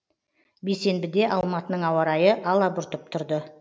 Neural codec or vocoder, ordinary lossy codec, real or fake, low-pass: none; none; real; none